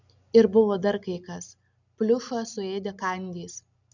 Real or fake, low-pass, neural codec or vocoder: real; 7.2 kHz; none